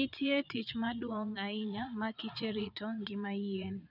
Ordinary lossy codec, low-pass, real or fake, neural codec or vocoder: none; 5.4 kHz; fake; vocoder, 22.05 kHz, 80 mel bands, Vocos